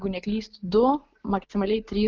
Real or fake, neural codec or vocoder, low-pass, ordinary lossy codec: real; none; 7.2 kHz; Opus, 24 kbps